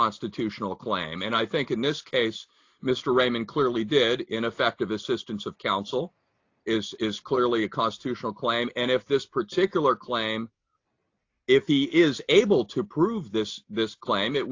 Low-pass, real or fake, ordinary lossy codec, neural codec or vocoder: 7.2 kHz; real; AAC, 48 kbps; none